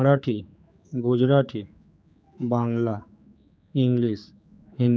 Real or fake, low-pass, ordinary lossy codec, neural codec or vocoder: fake; none; none; codec, 16 kHz, 4 kbps, X-Codec, HuBERT features, trained on general audio